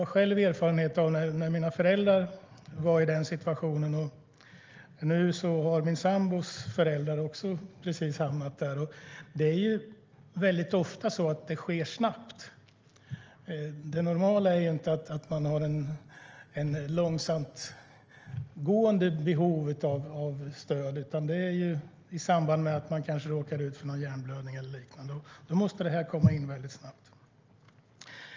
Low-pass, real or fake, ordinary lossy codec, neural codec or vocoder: 7.2 kHz; real; Opus, 24 kbps; none